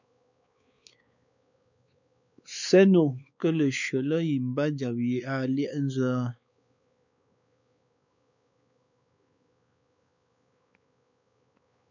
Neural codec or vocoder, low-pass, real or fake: codec, 16 kHz, 4 kbps, X-Codec, WavLM features, trained on Multilingual LibriSpeech; 7.2 kHz; fake